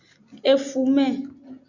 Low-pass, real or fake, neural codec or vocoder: 7.2 kHz; real; none